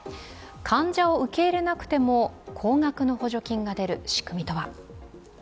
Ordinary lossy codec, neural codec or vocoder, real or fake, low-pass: none; none; real; none